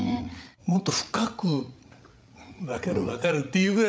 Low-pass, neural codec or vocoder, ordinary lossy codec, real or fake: none; codec, 16 kHz, 8 kbps, FreqCodec, larger model; none; fake